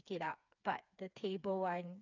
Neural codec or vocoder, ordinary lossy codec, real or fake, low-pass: codec, 16 kHz, 4 kbps, FreqCodec, smaller model; none; fake; 7.2 kHz